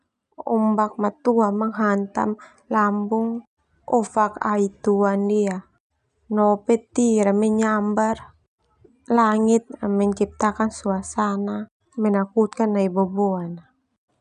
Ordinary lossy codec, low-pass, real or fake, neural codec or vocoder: none; 10.8 kHz; real; none